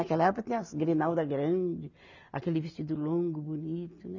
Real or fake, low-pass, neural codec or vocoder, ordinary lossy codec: real; 7.2 kHz; none; none